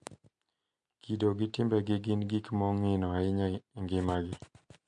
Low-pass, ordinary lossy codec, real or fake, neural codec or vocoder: 10.8 kHz; MP3, 64 kbps; real; none